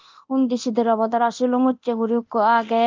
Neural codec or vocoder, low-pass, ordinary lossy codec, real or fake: codec, 24 kHz, 0.9 kbps, DualCodec; 7.2 kHz; Opus, 24 kbps; fake